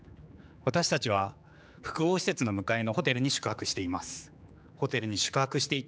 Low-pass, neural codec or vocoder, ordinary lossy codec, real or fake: none; codec, 16 kHz, 4 kbps, X-Codec, HuBERT features, trained on general audio; none; fake